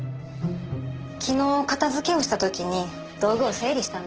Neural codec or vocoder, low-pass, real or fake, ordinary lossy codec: none; 7.2 kHz; real; Opus, 16 kbps